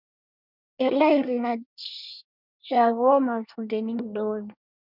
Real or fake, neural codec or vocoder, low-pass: fake; codec, 24 kHz, 1 kbps, SNAC; 5.4 kHz